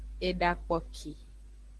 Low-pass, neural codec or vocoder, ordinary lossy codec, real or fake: 10.8 kHz; none; Opus, 16 kbps; real